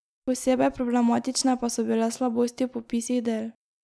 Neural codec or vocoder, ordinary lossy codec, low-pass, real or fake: none; none; none; real